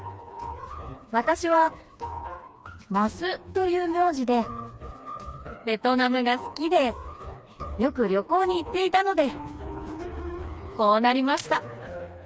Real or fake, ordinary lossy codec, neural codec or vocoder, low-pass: fake; none; codec, 16 kHz, 2 kbps, FreqCodec, smaller model; none